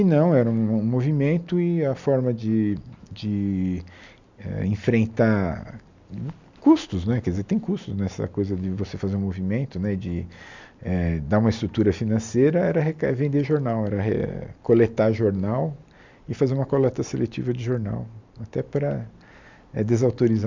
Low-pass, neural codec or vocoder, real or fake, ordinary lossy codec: 7.2 kHz; none; real; MP3, 64 kbps